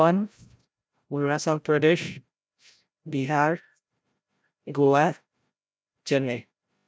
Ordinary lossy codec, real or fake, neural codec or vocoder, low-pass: none; fake; codec, 16 kHz, 0.5 kbps, FreqCodec, larger model; none